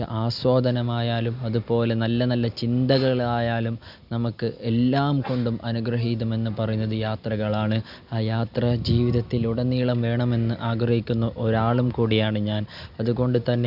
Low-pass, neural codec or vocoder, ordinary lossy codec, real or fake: 5.4 kHz; none; none; real